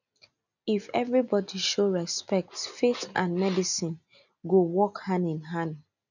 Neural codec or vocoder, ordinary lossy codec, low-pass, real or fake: none; none; 7.2 kHz; real